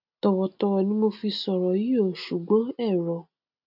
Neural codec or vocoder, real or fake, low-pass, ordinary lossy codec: none; real; 5.4 kHz; none